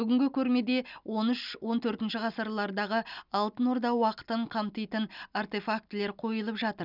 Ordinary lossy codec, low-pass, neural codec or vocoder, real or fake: none; 5.4 kHz; none; real